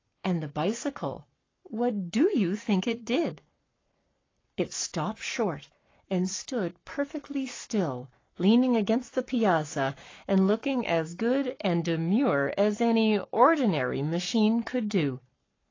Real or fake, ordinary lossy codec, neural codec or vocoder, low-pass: fake; AAC, 32 kbps; codec, 44.1 kHz, 7.8 kbps, Pupu-Codec; 7.2 kHz